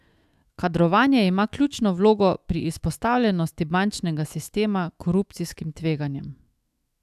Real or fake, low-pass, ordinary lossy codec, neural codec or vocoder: fake; 14.4 kHz; AAC, 96 kbps; autoencoder, 48 kHz, 128 numbers a frame, DAC-VAE, trained on Japanese speech